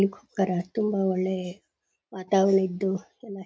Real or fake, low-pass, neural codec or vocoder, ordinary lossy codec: real; none; none; none